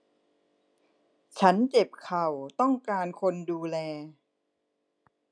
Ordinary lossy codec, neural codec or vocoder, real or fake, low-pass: none; none; real; 9.9 kHz